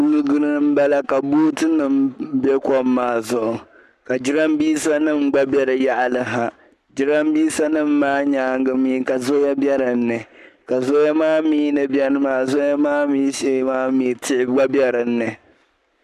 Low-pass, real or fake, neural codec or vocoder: 14.4 kHz; fake; codec, 44.1 kHz, 7.8 kbps, Pupu-Codec